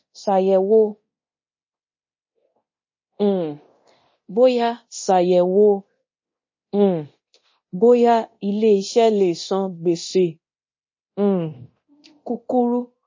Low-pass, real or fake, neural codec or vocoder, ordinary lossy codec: 7.2 kHz; fake; codec, 24 kHz, 0.9 kbps, DualCodec; MP3, 32 kbps